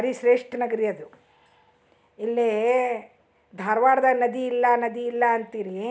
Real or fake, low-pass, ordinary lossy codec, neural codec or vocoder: real; none; none; none